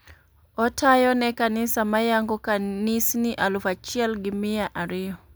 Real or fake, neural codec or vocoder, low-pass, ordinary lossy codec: real; none; none; none